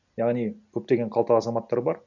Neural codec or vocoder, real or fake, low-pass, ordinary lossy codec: none; real; 7.2 kHz; none